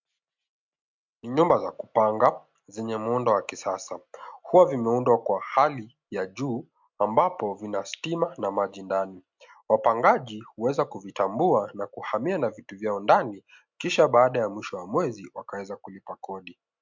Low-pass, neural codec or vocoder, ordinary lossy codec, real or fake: 7.2 kHz; none; MP3, 64 kbps; real